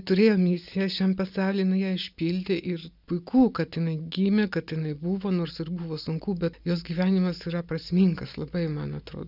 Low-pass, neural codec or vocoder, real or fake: 5.4 kHz; none; real